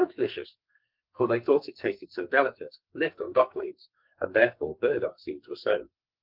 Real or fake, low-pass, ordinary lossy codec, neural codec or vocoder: fake; 5.4 kHz; Opus, 24 kbps; codec, 44.1 kHz, 2.6 kbps, SNAC